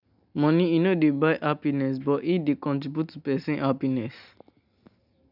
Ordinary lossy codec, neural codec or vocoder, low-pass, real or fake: none; none; 5.4 kHz; real